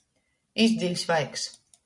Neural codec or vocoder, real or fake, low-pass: none; real; 10.8 kHz